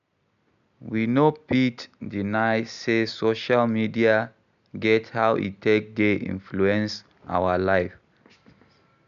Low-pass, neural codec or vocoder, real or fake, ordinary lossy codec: 7.2 kHz; none; real; none